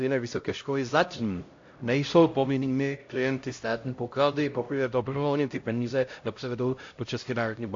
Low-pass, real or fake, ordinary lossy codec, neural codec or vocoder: 7.2 kHz; fake; AAC, 48 kbps; codec, 16 kHz, 0.5 kbps, X-Codec, HuBERT features, trained on LibriSpeech